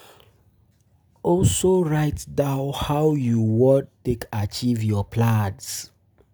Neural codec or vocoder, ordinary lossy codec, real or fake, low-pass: none; none; real; none